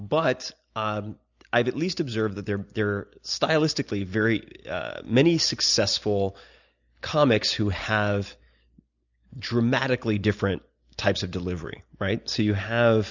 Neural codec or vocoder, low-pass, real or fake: none; 7.2 kHz; real